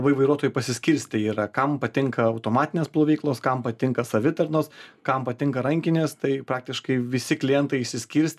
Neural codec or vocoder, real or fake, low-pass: none; real; 14.4 kHz